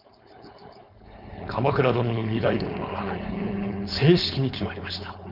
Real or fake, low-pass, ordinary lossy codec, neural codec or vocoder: fake; 5.4 kHz; none; codec, 16 kHz, 4.8 kbps, FACodec